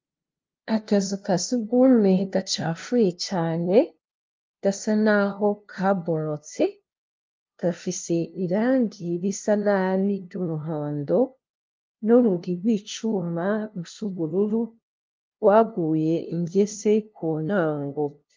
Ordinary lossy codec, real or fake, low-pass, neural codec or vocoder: Opus, 32 kbps; fake; 7.2 kHz; codec, 16 kHz, 0.5 kbps, FunCodec, trained on LibriTTS, 25 frames a second